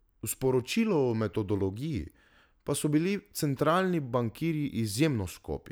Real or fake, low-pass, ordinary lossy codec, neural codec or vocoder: real; none; none; none